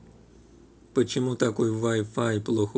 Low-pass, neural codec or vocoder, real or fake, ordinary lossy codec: none; none; real; none